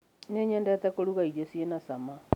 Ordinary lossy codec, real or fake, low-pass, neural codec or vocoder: none; real; 19.8 kHz; none